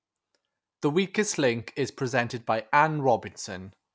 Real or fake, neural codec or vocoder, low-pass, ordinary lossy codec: real; none; none; none